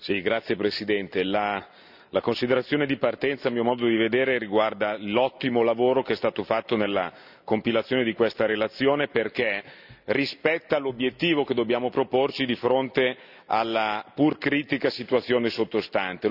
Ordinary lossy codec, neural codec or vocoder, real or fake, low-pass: none; none; real; 5.4 kHz